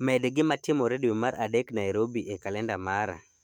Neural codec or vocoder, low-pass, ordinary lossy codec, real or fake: autoencoder, 48 kHz, 128 numbers a frame, DAC-VAE, trained on Japanese speech; 19.8 kHz; MP3, 96 kbps; fake